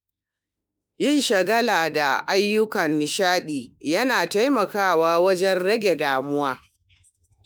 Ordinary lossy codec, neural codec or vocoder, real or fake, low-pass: none; autoencoder, 48 kHz, 32 numbers a frame, DAC-VAE, trained on Japanese speech; fake; none